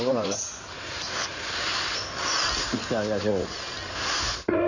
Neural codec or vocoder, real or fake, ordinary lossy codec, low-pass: codec, 16 kHz in and 24 kHz out, 2.2 kbps, FireRedTTS-2 codec; fake; none; 7.2 kHz